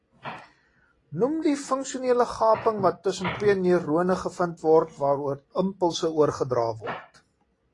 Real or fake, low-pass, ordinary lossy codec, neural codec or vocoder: real; 10.8 kHz; AAC, 32 kbps; none